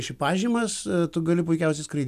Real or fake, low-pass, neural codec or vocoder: real; 14.4 kHz; none